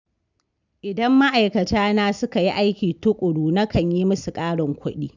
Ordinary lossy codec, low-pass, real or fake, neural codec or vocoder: none; 7.2 kHz; real; none